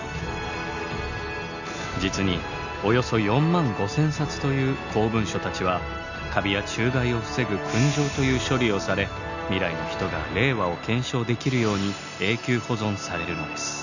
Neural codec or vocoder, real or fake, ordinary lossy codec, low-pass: none; real; none; 7.2 kHz